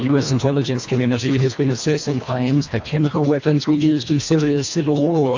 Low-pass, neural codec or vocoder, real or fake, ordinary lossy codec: 7.2 kHz; codec, 24 kHz, 1.5 kbps, HILCodec; fake; AAC, 48 kbps